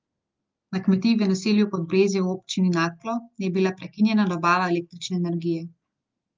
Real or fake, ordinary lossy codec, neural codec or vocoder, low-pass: real; Opus, 24 kbps; none; 7.2 kHz